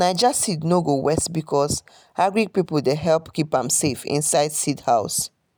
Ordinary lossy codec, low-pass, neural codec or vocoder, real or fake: none; none; none; real